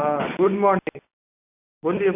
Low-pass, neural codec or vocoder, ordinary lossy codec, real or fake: 3.6 kHz; none; none; real